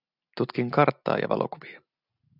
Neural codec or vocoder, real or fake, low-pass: none; real; 5.4 kHz